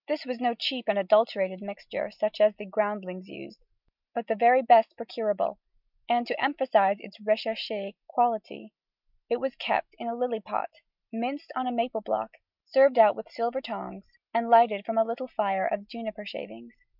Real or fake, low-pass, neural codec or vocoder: real; 5.4 kHz; none